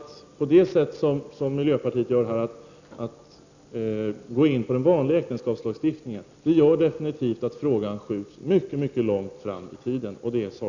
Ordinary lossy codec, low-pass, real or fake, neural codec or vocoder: none; 7.2 kHz; real; none